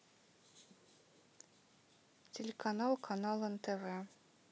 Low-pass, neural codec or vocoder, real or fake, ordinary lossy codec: none; none; real; none